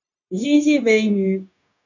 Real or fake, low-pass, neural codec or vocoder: fake; 7.2 kHz; codec, 16 kHz, 0.9 kbps, LongCat-Audio-Codec